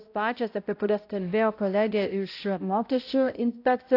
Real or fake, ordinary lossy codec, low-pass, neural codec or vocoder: fake; AAC, 32 kbps; 5.4 kHz; codec, 16 kHz, 0.5 kbps, X-Codec, HuBERT features, trained on balanced general audio